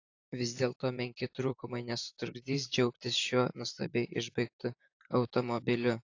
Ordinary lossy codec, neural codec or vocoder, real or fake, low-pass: AAC, 48 kbps; vocoder, 44.1 kHz, 128 mel bands, Pupu-Vocoder; fake; 7.2 kHz